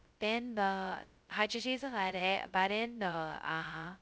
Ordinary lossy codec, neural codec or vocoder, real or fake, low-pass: none; codec, 16 kHz, 0.2 kbps, FocalCodec; fake; none